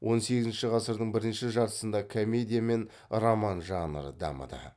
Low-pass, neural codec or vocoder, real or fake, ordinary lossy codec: none; none; real; none